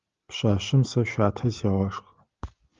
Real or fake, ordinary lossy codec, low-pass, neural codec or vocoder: real; Opus, 32 kbps; 7.2 kHz; none